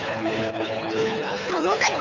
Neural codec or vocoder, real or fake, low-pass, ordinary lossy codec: codec, 24 kHz, 3 kbps, HILCodec; fake; 7.2 kHz; none